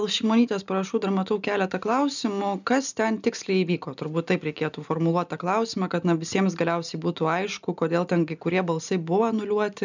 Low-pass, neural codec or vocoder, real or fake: 7.2 kHz; none; real